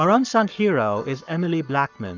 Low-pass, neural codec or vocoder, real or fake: 7.2 kHz; codec, 44.1 kHz, 7.8 kbps, Pupu-Codec; fake